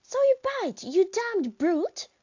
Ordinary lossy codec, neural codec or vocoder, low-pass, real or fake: AAC, 48 kbps; none; 7.2 kHz; real